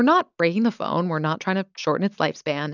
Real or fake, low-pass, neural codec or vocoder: real; 7.2 kHz; none